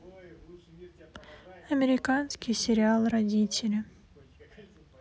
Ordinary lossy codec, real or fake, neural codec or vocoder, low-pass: none; real; none; none